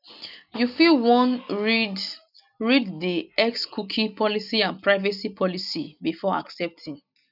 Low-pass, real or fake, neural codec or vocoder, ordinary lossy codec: 5.4 kHz; real; none; none